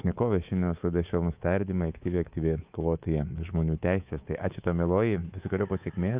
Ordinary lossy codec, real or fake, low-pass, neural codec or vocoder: Opus, 24 kbps; fake; 3.6 kHz; codec, 24 kHz, 3.1 kbps, DualCodec